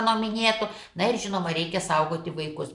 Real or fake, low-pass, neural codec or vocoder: fake; 10.8 kHz; vocoder, 44.1 kHz, 128 mel bands every 512 samples, BigVGAN v2